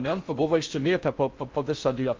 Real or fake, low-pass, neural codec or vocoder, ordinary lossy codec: fake; 7.2 kHz; codec, 16 kHz in and 24 kHz out, 0.6 kbps, FocalCodec, streaming, 4096 codes; Opus, 32 kbps